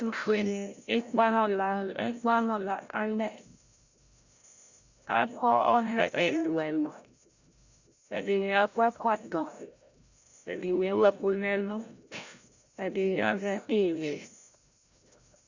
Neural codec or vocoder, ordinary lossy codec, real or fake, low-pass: codec, 16 kHz, 0.5 kbps, FreqCodec, larger model; Opus, 64 kbps; fake; 7.2 kHz